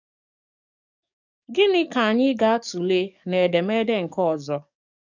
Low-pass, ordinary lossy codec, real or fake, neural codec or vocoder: 7.2 kHz; none; fake; codec, 44.1 kHz, 7.8 kbps, DAC